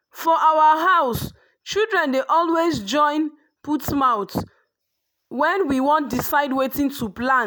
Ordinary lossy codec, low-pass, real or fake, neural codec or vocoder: none; none; real; none